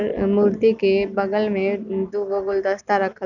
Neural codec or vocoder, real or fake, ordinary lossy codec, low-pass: none; real; none; 7.2 kHz